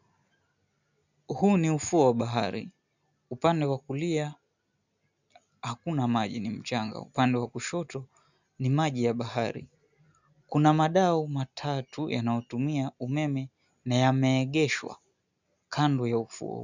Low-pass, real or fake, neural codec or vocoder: 7.2 kHz; real; none